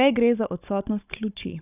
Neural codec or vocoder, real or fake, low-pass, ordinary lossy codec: codec, 16 kHz, 16 kbps, FunCodec, trained on Chinese and English, 50 frames a second; fake; 3.6 kHz; none